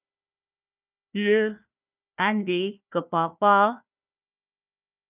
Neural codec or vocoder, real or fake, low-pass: codec, 16 kHz, 1 kbps, FunCodec, trained on Chinese and English, 50 frames a second; fake; 3.6 kHz